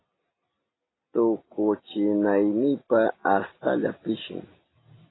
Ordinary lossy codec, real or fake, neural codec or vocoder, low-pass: AAC, 16 kbps; real; none; 7.2 kHz